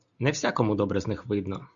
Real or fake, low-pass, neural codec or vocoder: real; 7.2 kHz; none